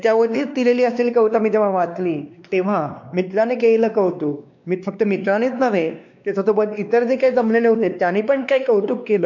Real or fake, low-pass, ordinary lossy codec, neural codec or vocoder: fake; 7.2 kHz; none; codec, 16 kHz, 2 kbps, X-Codec, WavLM features, trained on Multilingual LibriSpeech